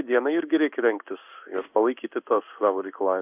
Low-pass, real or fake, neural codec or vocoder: 3.6 kHz; fake; codec, 16 kHz in and 24 kHz out, 1 kbps, XY-Tokenizer